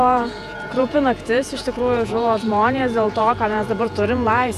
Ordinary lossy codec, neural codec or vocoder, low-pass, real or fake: AAC, 96 kbps; vocoder, 48 kHz, 128 mel bands, Vocos; 14.4 kHz; fake